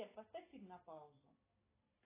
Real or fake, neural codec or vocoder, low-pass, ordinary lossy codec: real; none; 3.6 kHz; AAC, 16 kbps